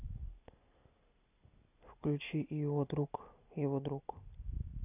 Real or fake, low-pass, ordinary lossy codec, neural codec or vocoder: real; 3.6 kHz; none; none